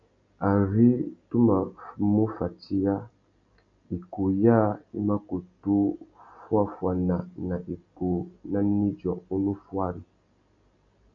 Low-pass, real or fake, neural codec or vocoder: 7.2 kHz; real; none